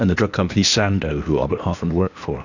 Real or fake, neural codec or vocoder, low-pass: fake; codec, 16 kHz, 0.8 kbps, ZipCodec; 7.2 kHz